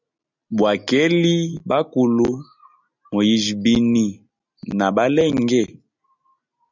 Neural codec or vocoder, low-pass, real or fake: none; 7.2 kHz; real